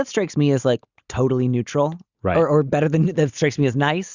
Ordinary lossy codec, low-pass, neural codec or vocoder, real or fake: Opus, 64 kbps; 7.2 kHz; none; real